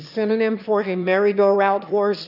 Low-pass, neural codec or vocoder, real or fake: 5.4 kHz; autoencoder, 22.05 kHz, a latent of 192 numbers a frame, VITS, trained on one speaker; fake